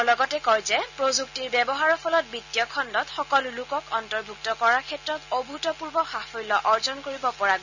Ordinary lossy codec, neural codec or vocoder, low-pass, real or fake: none; none; 7.2 kHz; real